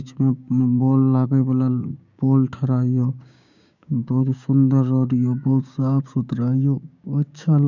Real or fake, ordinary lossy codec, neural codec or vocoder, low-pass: fake; none; codec, 16 kHz, 6 kbps, DAC; 7.2 kHz